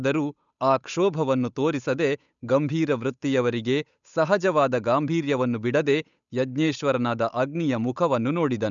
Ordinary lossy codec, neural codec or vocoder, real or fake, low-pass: none; codec, 16 kHz, 8 kbps, FunCodec, trained on Chinese and English, 25 frames a second; fake; 7.2 kHz